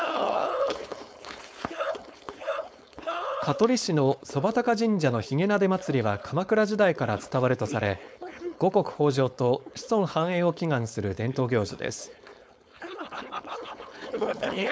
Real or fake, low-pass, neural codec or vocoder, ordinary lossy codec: fake; none; codec, 16 kHz, 4.8 kbps, FACodec; none